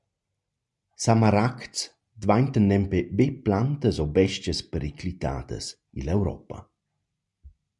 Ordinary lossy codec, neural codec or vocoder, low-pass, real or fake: MP3, 96 kbps; none; 10.8 kHz; real